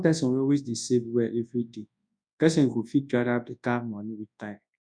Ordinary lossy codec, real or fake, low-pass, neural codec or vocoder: none; fake; 9.9 kHz; codec, 24 kHz, 0.9 kbps, WavTokenizer, large speech release